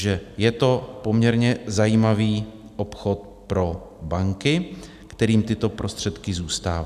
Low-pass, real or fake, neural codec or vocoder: 14.4 kHz; real; none